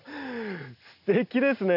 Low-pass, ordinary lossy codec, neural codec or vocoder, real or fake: 5.4 kHz; none; none; real